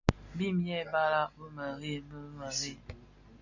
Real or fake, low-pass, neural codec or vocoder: real; 7.2 kHz; none